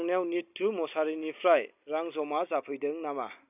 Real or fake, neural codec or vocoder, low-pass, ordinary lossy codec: real; none; 3.6 kHz; none